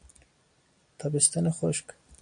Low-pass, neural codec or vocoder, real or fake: 9.9 kHz; none; real